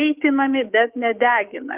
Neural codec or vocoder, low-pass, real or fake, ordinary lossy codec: codec, 16 kHz, 6 kbps, DAC; 3.6 kHz; fake; Opus, 24 kbps